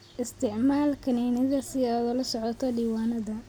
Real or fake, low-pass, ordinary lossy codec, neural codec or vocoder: real; none; none; none